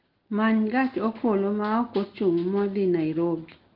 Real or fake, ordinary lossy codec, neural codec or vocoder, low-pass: real; Opus, 16 kbps; none; 5.4 kHz